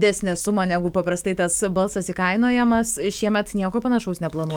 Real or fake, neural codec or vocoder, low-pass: fake; codec, 44.1 kHz, 7.8 kbps, DAC; 19.8 kHz